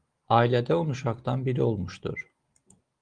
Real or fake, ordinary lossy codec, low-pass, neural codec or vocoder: real; Opus, 24 kbps; 9.9 kHz; none